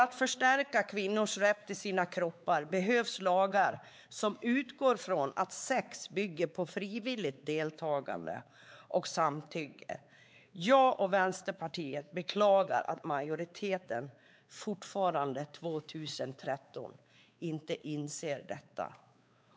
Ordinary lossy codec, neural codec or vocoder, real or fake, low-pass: none; codec, 16 kHz, 4 kbps, X-Codec, WavLM features, trained on Multilingual LibriSpeech; fake; none